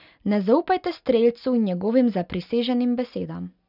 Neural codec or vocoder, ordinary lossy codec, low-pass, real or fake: none; none; 5.4 kHz; real